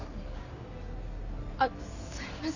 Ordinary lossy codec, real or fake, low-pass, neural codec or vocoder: none; real; 7.2 kHz; none